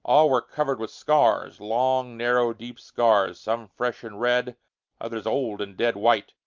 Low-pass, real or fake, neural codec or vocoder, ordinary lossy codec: 7.2 kHz; real; none; Opus, 24 kbps